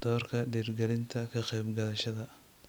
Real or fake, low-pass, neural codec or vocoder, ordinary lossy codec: real; none; none; none